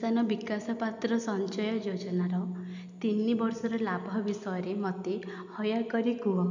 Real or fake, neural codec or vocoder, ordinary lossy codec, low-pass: real; none; none; 7.2 kHz